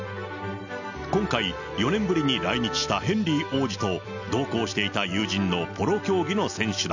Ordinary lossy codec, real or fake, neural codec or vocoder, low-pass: none; real; none; 7.2 kHz